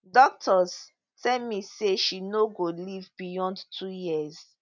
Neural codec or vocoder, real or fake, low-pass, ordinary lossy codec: none; real; 7.2 kHz; none